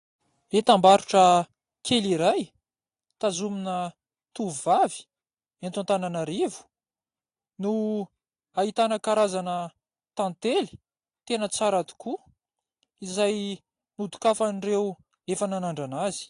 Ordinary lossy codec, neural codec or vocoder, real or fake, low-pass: AAC, 48 kbps; none; real; 10.8 kHz